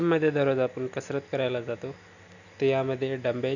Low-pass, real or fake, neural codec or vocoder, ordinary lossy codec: 7.2 kHz; real; none; none